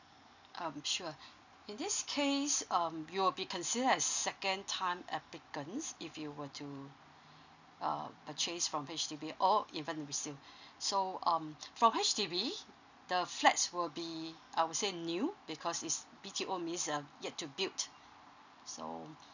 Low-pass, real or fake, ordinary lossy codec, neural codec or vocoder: 7.2 kHz; real; none; none